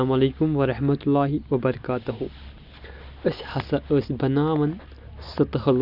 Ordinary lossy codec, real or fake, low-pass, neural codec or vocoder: none; real; 5.4 kHz; none